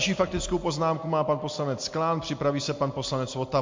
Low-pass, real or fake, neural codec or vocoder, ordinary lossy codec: 7.2 kHz; real; none; MP3, 48 kbps